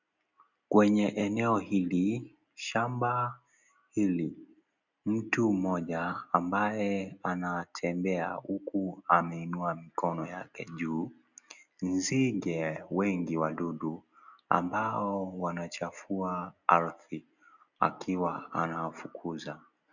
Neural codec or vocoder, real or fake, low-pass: none; real; 7.2 kHz